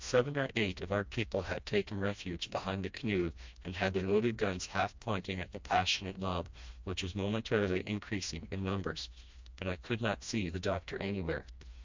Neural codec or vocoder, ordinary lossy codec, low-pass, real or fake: codec, 16 kHz, 1 kbps, FreqCodec, smaller model; MP3, 64 kbps; 7.2 kHz; fake